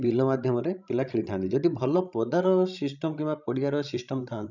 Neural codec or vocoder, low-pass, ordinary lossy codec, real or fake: codec, 16 kHz, 16 kbps, FreqCodec, larger model; 7.2 kHz; none; fake